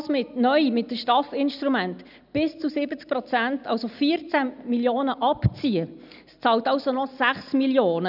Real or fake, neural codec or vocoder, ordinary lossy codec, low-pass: real; none; none; 5.4 kHz